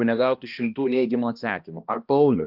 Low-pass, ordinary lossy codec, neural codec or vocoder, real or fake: 5.4 kHz; Opus, 24 kbps; codec, 16 kHz, 1 kbps, X-Codec, HuBERT features, trained on balanced general audio; fake